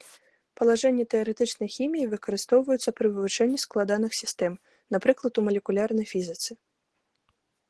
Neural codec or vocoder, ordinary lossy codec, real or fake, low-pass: none; Opus, 16 kbps; real; 10.8 kHz